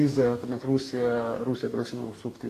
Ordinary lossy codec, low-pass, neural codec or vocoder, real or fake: AAC, 48 kbps; 14.4 kHz; codec, 44.1 kHz, 2.6 kbps, DAC; fake